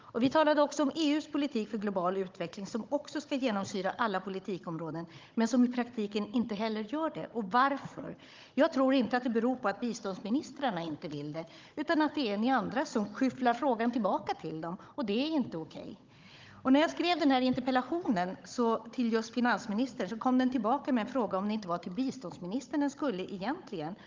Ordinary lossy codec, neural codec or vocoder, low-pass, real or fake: Opus, 24 kbps; codec, 16 kHz, 16 kbps, FunCodec, trained on Chinese and English, 50 frames a second; 7.2 kHz; fake